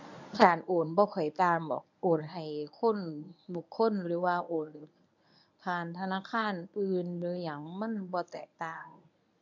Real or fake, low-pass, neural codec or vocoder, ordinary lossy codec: fake; 7.2 kHz; codec, 24 kHz, 0.9 kbps, WavTokenizer, medium speech release version 1; none